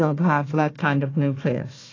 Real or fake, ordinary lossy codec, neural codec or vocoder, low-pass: fake; AAC, 32 kbps; codec, 16 kHz, 1 kbps, FunCodec, trained on Chinese and English, 50 frames a second; 7.2 kHz